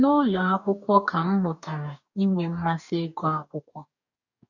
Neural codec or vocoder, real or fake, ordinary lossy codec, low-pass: codec, 44.1 kHz, 2.6 kbps, DAC; fake; none; 7.2 kHz